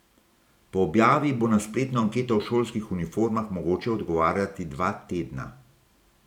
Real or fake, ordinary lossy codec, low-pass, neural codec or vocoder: fake; none; 19.8 kHz; vocoder, 44.1 kHz, 128 mel bands every 512 samples, BigVGAN v2